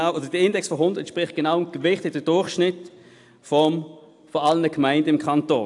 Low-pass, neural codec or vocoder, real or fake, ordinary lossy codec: 10.8 kHz; none; real; AAC, 64 kbps